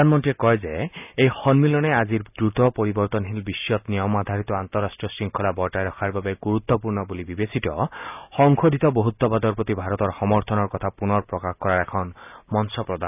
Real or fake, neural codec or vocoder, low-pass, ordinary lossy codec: real; none; 3.6 kHz; none